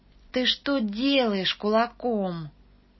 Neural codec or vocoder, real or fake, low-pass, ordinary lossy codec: none; real; 7.2 kHz; MP3, 24 kbps